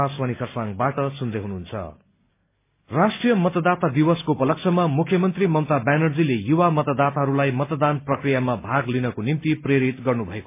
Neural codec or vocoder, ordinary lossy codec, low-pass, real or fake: none; MP3, 24 kbps; 3.6 kHz; real